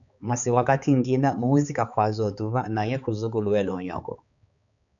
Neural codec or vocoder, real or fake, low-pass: codec, 16 kHz, 4 kbps, X-Codec, HuBERT features, trained on balanced general audio; fake; 7.2 kHz